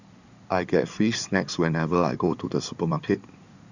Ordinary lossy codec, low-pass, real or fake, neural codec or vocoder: none; 7.2 kHz; fake; codec, 16 kHz in and 24 kHz out, 2.2 kbps, FireRedTTS-2 codec